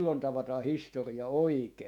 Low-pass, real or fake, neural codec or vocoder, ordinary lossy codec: 19.8 kHz; fake; autoencoder, 48 kHz, 128 numbers a frame, DAC-VAE, trained on Japanese speech; none